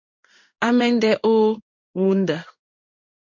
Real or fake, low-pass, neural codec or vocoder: fake; 7.2 kHz; codec, 16 kHz in and 24 kHz out, 1 kbps, XY-Tokenizer